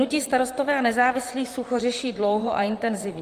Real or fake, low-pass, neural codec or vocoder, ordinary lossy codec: fake; 14.4 kHz; vocoder, 44.1 kHz, 128 mel bands, Pupu-Vocoder; Opus, 32 kbps